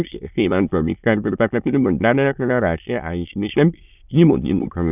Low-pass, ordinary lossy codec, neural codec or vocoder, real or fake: 3.6 kHz; none; autoencoder, 22.05 kHz, a latent of 192 numbers a frame, VITS, trained on many speakers; fake